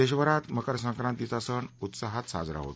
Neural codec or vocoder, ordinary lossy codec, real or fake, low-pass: none; none; real; none